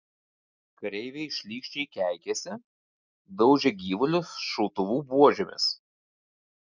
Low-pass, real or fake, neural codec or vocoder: 7.2 kHz; real; none